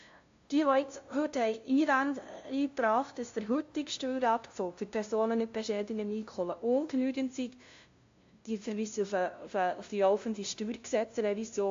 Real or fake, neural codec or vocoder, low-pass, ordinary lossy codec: fake; codec, 16 kHz, 0.5 kbps, FunCodec, trained on LibriTTS, 25 frames a second; 7.2 kHz; AAC, 48 kbps